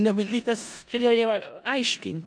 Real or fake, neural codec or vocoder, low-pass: fake; codec, 16 kHz in and 24 kHz out, 0.4 kbps, LongCat-Audio-Codec, four codebook decoder; 9.9 kHz